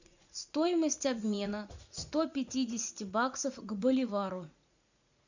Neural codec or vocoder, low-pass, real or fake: vocoder, 44.1 kHz, 80 mel bands, Vocos; 7.2 kHz; fake